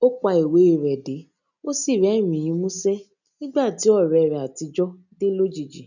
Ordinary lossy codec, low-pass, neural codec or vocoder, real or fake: none; 7.2 kHz; none; real